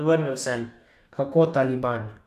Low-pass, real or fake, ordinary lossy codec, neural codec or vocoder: 14.4 kHz; fake; none; codec, 44.1 kHz, 2.6 kbps, DAC